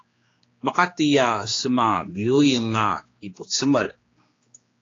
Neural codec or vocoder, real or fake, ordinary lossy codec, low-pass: codec, 16 kHz, 2 kbps, X-Codec, HuBERT features, trained on general audio; fake; AAC, 32 kbps; 7.2 kHz